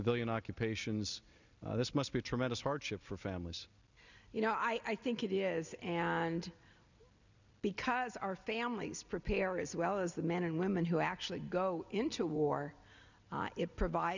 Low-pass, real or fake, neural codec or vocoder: 7.2 kHz; real; none